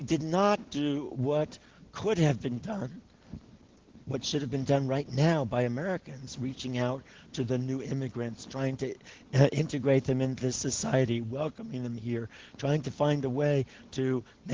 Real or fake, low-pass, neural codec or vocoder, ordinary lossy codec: real; 7.2 kHz; none; Opus, 16 kbps